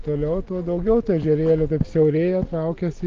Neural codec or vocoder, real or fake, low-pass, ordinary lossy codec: none; real; 7.2 kHz; Opus, 32 kbps